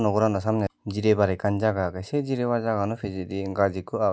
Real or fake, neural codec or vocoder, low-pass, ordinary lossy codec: real; none; none; none